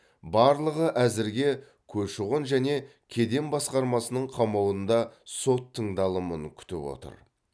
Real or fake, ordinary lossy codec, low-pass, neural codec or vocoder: real; none; none; none